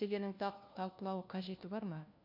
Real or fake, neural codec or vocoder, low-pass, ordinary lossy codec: fake; codec, 16 kHz, 1 kbps, FunCodec, trained on LibriTTS, 50 frames a second; 5.4 kHz; none